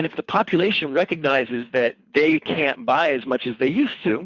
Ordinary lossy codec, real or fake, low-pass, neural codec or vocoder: Opus, 64 kbps; fake; 7.2 kHz; codec, 24 kHz, 3 kbps, HILCodec